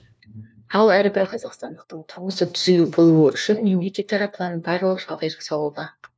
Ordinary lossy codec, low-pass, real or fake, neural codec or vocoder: none; none; fake; codec, 16 kHz, 1 kbps, FunCodec, trained on LibriTTS, 50 frames a second